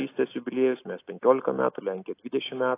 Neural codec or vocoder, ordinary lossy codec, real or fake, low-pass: none; AAC, 24 kbps; real; 3.6 kHz